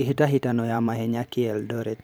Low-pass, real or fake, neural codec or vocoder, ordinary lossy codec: none; fake; vocoder, 44.1 kHz, 128 mel bands every 512 samples, BigVGAN v2; none